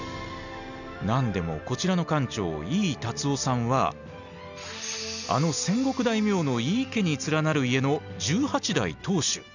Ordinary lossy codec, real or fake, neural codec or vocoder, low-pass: none; real; none; 7.2 kHz